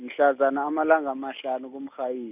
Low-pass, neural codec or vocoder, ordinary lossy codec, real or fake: 3.6 kHz; none; none; real